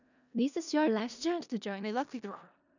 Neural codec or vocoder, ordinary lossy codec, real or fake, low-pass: codec, 16 kHz in and 24 kHz out, 0.4 kbps, LongCat-Audio-Codec, four codebook decoder; none; fake; 7.2 kHz